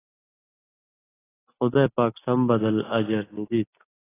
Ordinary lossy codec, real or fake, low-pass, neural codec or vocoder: AAC, 16 kbps; real; 3.6 kHz; none